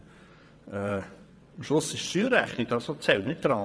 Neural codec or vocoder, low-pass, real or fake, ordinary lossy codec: vocoder, 22.05 kHz, 80 mel bands, WaveNeXt; none; fake; none